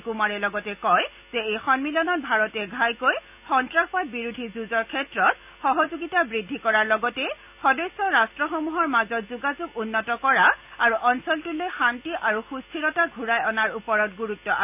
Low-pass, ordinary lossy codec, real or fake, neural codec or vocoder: 3.6 kHz; none; real; none